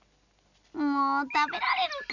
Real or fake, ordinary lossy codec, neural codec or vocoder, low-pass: real; none; none; 7.2 kHz